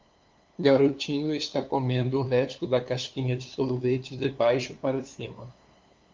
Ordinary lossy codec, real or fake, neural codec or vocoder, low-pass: Opus, 24 kbps; fake; codec, 16 kHz, 2 kbps, FunCodec, trained on LibriTTS, 25 frames a second; 7.2 kHz